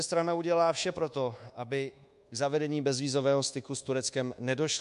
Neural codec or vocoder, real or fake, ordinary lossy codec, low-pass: codec, 24 kHz, 1.2 kbps, DualCodec; fake; MP3, 64 kbps; 10.8 kHz